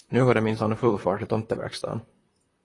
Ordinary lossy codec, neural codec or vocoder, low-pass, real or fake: AAC, 32 kbps; vocoder, 44.1 kHz, 128 mel bands, Pupu-Vocoder; 10.8 kHz; fake